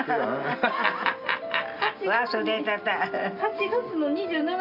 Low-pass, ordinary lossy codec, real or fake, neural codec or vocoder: 5.4 kHz; none; real; none